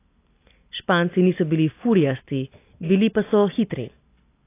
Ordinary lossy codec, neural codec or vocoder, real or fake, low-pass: AAC, 24 kbps; none; real; 3.6 kHz